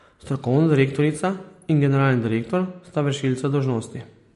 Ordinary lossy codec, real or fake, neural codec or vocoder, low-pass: MP3, 48 kbps; real; none; 14.4 kHz